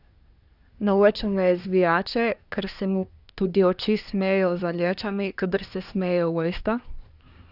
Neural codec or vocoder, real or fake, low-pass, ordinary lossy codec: codec, 24 kHz, 1 kbps, SNAC; fake; 5.4 kHz; none